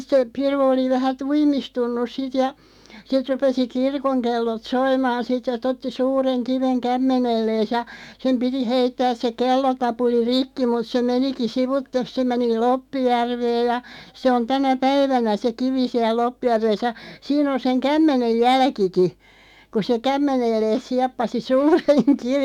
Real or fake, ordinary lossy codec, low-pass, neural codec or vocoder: fake; none; 19.8 kHz; autoencoder, 48 kHz, 128 numbers a frame, DAC-VAE, trained on Japanese speech